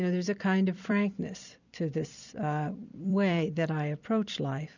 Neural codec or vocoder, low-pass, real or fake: vocoder, 44.1 kHz, 128 mel bands every 256 samples, BigVGAN v2; 7.2 kHz; fake